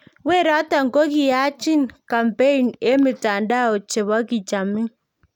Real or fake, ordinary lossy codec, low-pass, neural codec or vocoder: real; none; 19.8 kHz; none